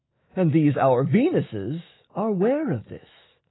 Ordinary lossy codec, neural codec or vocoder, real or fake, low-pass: AAC, 16 kbps; autoencoder, 48 kHz, 128 numbers a frame, DAC-VAE, trained on Japanese speech; fake; 7.2 kHz